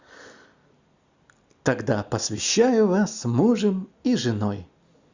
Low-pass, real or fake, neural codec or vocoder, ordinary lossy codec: 7.2 kHz; real; none; Opus, 64 kbps